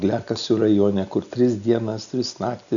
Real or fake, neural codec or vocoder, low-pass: real; none; 7.2 kHz